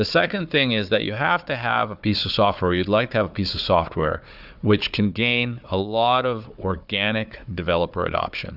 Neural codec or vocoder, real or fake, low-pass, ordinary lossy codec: codec, 16 kHz, 4 kbps, FunCodec, trained on Chinese and English, 50 frames a second; fake; 5.4 kHz; Opus, 64 kbps